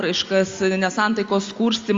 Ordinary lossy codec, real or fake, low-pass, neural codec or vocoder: Opus, 32 kbps; real; 7.2 kHz; none